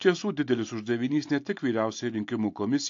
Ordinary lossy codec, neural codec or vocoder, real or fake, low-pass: AAC, 48 kbps; none; real; 7.2 kHz